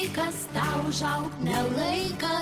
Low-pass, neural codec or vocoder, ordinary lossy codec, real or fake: 14.4 kHz; none; Opus, 16 kbps; real